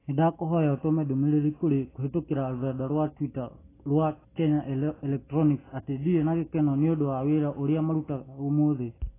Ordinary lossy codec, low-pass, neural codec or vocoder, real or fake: AAC, 16 kbps; 3.6 kHz; none; real